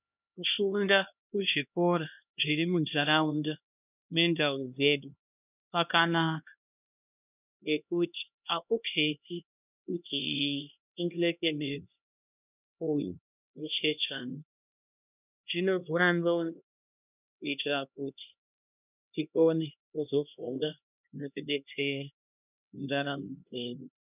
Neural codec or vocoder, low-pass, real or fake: codec, 16 kHz, 1 kbps, X-Codec, HuBERT features, trained on LibriSpeech; 3.6 kHz; fake